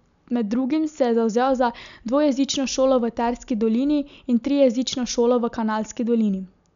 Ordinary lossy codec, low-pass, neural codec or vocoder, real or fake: none; 7.2 kHz; none; real